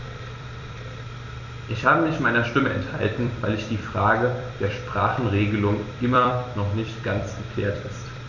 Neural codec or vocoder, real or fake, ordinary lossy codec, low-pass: vocoder, 44.1 kHz, 128 mel bands every 256 samples, BigVGAN v2; fake; none; 7.2 kHz